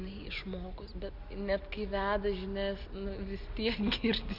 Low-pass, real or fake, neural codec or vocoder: 5.4 kHz; real; none